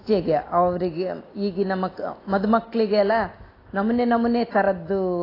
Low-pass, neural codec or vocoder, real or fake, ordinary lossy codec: 5.4 kHz; none; real; AAC, 24 kbps